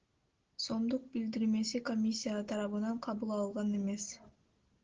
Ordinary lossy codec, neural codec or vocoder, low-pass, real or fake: Opus, 16 kbps; none; 7.2 kHz; real